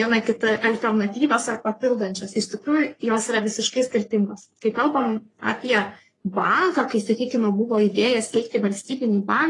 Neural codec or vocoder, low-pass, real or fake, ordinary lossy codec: codec, 44.1 kHz, 3.4 kbps, Pupu-Codec; 10.8 kHz; fake; AAC, 32 kbps